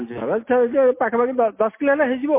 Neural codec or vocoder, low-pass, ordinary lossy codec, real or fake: none; 3.6 kHz; MP3, 24 kbps; real